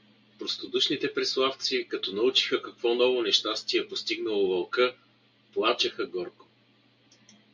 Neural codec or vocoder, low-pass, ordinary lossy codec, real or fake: none; 7.2 kHz; MP3, 64 kbps; real